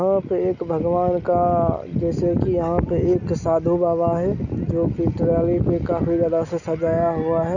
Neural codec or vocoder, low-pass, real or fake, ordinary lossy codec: none; 7.2 kHz; real; none